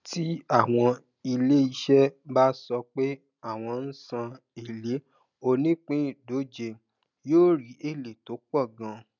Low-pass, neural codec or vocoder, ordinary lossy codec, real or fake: 7.2 kHz; none; none; real